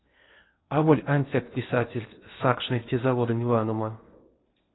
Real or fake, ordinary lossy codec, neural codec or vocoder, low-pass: fake; AAC, 16 kbps; codec, 16 kHz in and 24 kHz out, 0.8 kbps, FocalCodec, streaming, 65536 codes; 7.2 kHz